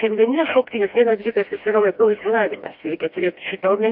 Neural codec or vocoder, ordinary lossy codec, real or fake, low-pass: codec, 16 kHz, 1 kbps, FreqCodec, smaller model; AAC, 48 kbps; fake; 5.4 kHz